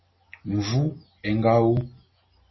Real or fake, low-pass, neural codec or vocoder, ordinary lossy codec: real; 7.2 kHz; none; MP3, 24 kbps